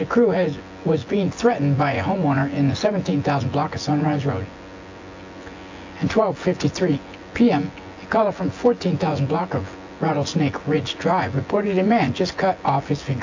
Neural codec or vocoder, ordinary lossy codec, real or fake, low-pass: vocoder, 24 kHz, 100 mel bands, Vocos; AAC, 48 kbps; fake; 7.2 kHz